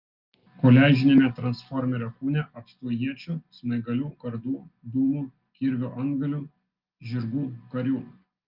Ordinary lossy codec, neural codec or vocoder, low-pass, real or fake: Opus, 32 kbps; none; 5.4 kHz; real